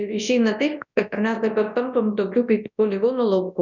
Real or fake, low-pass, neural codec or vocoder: fake; 7.2 kHz; codec, 24 kHz, 0.9 kbps, WavTokenizer, large speech release